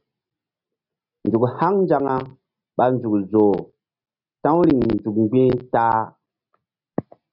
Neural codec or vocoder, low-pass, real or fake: none; 5.4 kHz; real